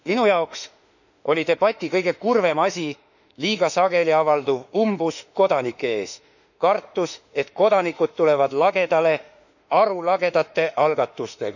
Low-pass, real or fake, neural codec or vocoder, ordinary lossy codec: 7.2 kHz; fake; autoencoder, 48 kHz, 32 numbers a frame, DAC-VAE, trained on Japanese speech; none